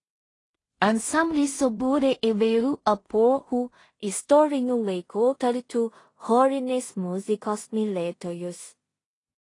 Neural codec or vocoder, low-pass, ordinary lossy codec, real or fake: codec, 16 kHz in and 24 kHz out, 0.4 kbps, LongCat-Audio-Codec, two codebook decoder; 10.8 kHz; AAC, 32 kbps; fake